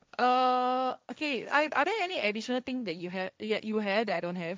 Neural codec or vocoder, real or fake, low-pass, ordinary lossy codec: codec, 16 kHz, 1.1 kbps, Voila-Tokenizer; fake; none; none